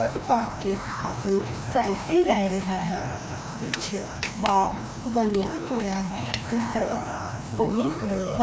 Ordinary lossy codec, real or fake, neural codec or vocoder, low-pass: none; fake; codec, 16 kHz, 1 kbps, FreqCodec, larger model; none